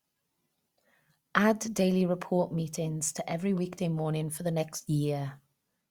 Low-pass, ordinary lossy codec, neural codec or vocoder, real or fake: 19.8 kHz; Opus, 64 kbps; none; real